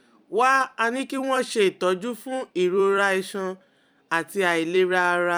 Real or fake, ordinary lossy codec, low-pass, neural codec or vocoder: fake; none; 19.8 kHz; vocoder, 44.1 kHz, 128 mel bands every 256 samples, BigVGAN v2